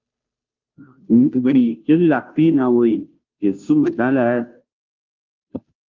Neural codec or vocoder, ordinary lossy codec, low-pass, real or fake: codec, 16 kHz, 0.5 kbps, FunCodec, trained on Chinese and English, 25 frames a second; Opus, 24 kbps; 7.2 kHz; fake